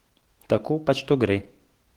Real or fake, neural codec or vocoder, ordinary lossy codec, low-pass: fake; autoencoder, 48 kHz, 128 numbers a frame, DAC-VAE, trained on Japanese speech; Opus, 16 kbps; 19.8 kHz